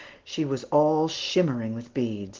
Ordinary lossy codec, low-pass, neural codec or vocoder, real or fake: Opus, 16 kbps; 7.2 kHz; none; real